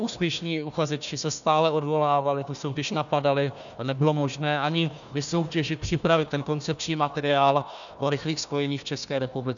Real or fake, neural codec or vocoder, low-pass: fake; codec, 16 kHz, 1 kbps, FunCodec, trained on Chinese and English, 50 frames a second; 7.2 kHz